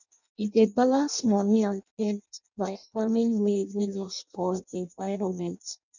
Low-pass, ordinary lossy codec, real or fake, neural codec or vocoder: 7.2 kHz; none; fake; codec, 16 kHz in and 24 kHz out, 0.6 kbps, FireRedTTS-2 codec